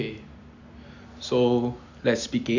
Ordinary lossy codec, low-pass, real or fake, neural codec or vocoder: none; 7.2 kHz; real; none